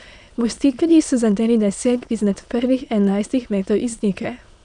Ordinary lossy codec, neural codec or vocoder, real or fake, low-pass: none; autoencoder, 22.05 kHz, a latent of 192 numbers a frame, VITS, trained on many speakers; fake; 9.9 kHz